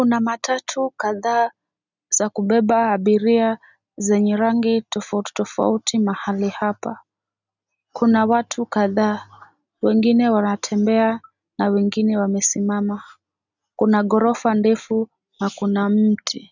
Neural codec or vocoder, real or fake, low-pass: none; real; 7.2 kHz